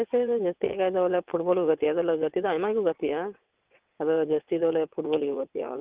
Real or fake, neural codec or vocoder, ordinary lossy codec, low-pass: fake; vocoder, 22.05 kHz, 80 mel bands, WaveNeXt; Opus, 32 kbps; 3.6 kHz